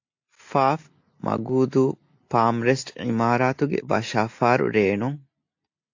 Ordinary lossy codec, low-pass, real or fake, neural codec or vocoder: AAC, 48 kbps; 7.2 kHz; real; none